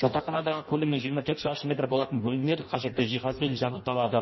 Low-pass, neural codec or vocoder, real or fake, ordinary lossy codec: 7.2 kHz; codec, 16 kHz in and 24 kHz out, 0.6 kbps, FireRedTTS-2 codec; fake; MP3, 24 kbps